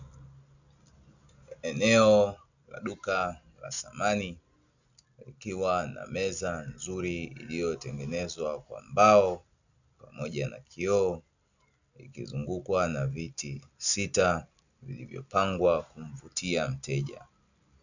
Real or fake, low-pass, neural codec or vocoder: real; 7.2 kHz; none